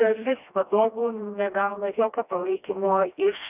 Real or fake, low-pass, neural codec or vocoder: fake; 3.6 kHz; codec, 16 kHz, 1 kbps, FreqCodec, smaller model